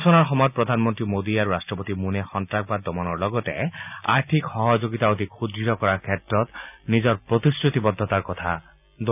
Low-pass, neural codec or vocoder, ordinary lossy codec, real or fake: 3.6 kHz; none; none; real